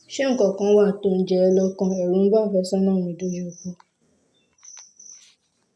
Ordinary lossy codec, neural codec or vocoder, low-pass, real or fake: none; none; none; real